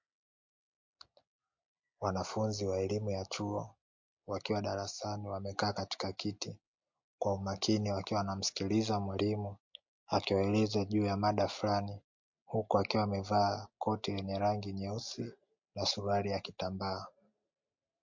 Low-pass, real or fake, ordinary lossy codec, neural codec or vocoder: 7.2 kHz; real; MP3, 48 kbps; none